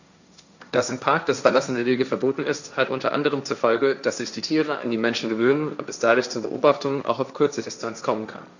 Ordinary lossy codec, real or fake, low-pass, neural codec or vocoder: none; fake; 7.2 kHz; codec, 16 kHz, 1.1 kbps, Voila-Tokenizer